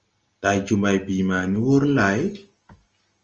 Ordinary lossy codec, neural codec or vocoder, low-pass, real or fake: Opus, 24 kbps; none; 7.2 kHz; real